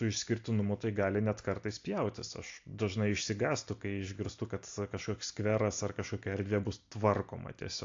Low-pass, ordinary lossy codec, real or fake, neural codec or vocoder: 7.2 kHz; AAC, 48 kbps; real; none